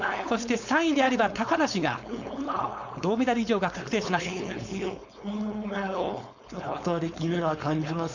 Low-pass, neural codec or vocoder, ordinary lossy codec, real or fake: 7.2 kHz; codec, 16 kHz, 4.8 kbps, FACodec; none; fake